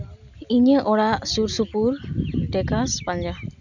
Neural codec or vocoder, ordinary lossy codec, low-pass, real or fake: none; none; 7.2 kHz; real